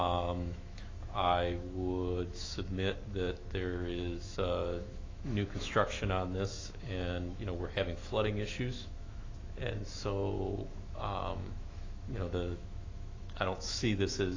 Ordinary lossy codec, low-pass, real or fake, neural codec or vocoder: AAC, 32 kbps; 7.2 kHz; fake; autoencoder, 48 kHz, 128 numbers a frame, DAC-VAE, trained on Japanese speech